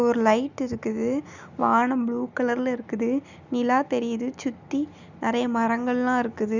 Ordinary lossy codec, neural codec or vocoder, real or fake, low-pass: none; none; real; 7.2 kHz